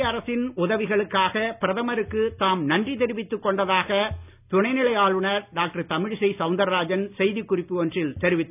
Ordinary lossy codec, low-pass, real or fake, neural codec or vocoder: none; 3.6 kHz; real; none